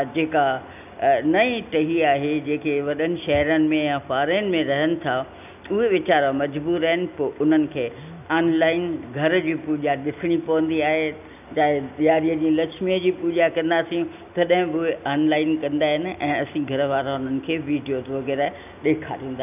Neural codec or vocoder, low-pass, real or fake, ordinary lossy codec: none; 3.6 kHz; real; none